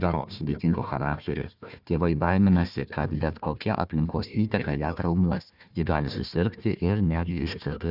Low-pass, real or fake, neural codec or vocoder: 5.4 kHz; fake; codec, 16 kHz, 1 kbps, FunCodec, trained on Chinese and English, 50 frames a second